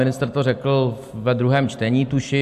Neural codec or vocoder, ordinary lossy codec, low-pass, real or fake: none; AAC, 96 kbps; 14.4 kHz; real